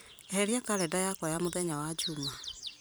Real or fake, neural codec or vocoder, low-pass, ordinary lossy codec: fake; vocoder, 44.1 kHz, 128 mel bands every 512 samples, BigVGAN v2; none; none